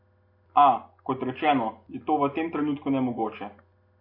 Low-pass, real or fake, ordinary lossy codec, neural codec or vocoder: 5.4 kHz; real; MP3, 32 kbps; none